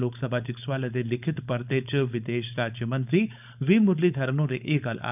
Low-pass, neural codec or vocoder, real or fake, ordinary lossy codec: 3.6 kHz; codec, 16 kHz, 4.8 kbps, FACodec; fake; none